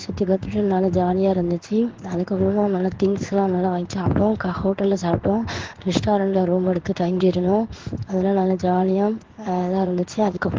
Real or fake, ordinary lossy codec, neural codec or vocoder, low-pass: fake; Opus, 16 kbps; codec, 16 kHz in and 24 kHz out, 1 kbps, XY-Tokenizer; 7.2 kHz